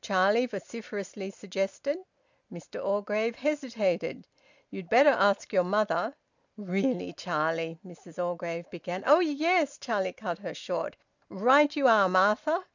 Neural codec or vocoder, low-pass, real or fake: none; 7.2 kHz; real